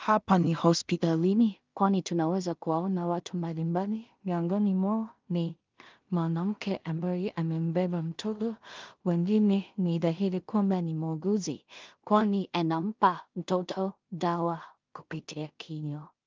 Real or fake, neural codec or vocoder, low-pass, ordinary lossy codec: fake; codec, 16 kHz in and 24 kHz out, 0.4 kbps, LongCat-Audio-Codec, two codebook decoder; 7.2 kHz; Opus, 24 kbps